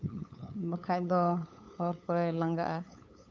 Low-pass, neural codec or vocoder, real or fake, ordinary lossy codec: none; codec, 16 kHz, 8 kbps, FunCodec, trained on LibriTTS, 25 frames a second; fake; none